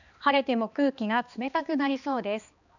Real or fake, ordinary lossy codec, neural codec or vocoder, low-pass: fake; none; codec, 16 kHz, 2 kbps, X-Codec, HuBERT features, trained on balanced general audio; 7.2 kHz